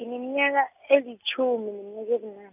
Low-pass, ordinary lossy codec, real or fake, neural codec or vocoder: 3.6 kHz; none; real; none